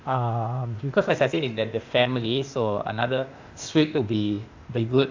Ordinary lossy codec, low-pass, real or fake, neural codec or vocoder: AAC, 48 kbps; 7.2 kHz; fake; codec, 16 kHz, 0.8 kbps, ZipCodec